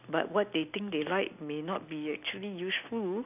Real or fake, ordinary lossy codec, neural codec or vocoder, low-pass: real; none; none; 3.6 kHz